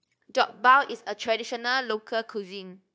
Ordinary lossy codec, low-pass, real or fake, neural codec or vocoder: none; none; fake; codec, 16 kHz, 0.9 kbps, LongCat-Audio-Codec